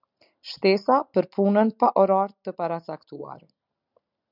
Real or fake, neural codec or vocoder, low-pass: real; none; 5.4 kHz